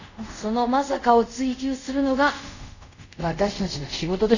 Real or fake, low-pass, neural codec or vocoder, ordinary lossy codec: fake; 7.2 kHz; codec, 24 kHz, 0.5 kbps, DualCodec; none